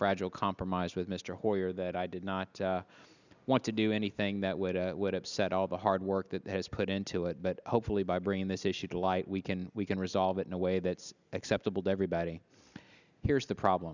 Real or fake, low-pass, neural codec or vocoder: real; 7.2 kHz; none